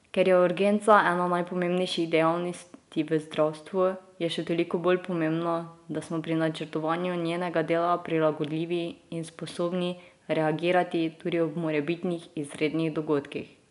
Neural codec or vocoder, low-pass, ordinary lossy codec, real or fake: none; 10.8 kHz; none; real